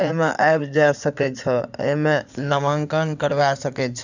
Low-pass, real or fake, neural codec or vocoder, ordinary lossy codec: 7.2 kHz; fake; codec, 16 kHz in and 24 kHz out, 2.2 kbps, FireRedTTS-2 codec; none